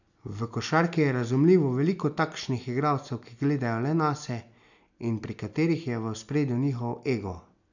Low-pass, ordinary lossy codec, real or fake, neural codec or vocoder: 7.2 kHz; none; real; none